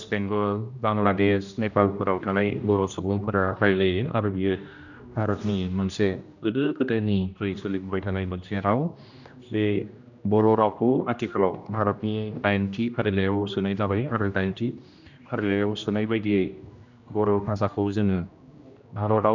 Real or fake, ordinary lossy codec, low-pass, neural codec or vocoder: fake; none; 7.2 kHz; codec, 16 kHz, 1 kbps, X-Codec, HuBERT features, trained on general audio